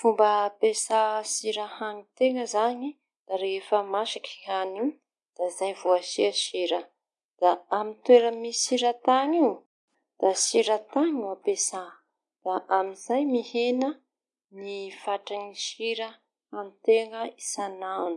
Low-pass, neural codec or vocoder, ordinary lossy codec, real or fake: 10.8 kHz; none; MP3, 64 kbps; real